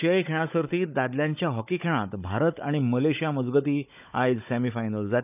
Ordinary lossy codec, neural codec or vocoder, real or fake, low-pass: none; codec, 16 kHz, 16 kbps, FunCodec, trained on Chinese and English, 50 frames a second; fake; 3.6 kHz